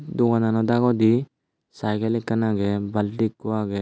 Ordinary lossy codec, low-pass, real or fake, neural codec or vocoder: none; none; real; none